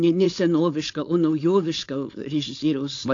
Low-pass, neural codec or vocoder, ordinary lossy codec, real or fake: 7.2 kHz; codec, 16 kHz, 4.8 kbps, FACodec; AAC, 48 kbps; fake